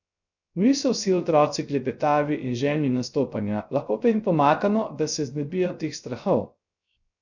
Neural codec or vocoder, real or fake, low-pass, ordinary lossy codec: codec, 16 kHz, 0.3 kbps, FocalCodec; fake; 7.2 kHz; none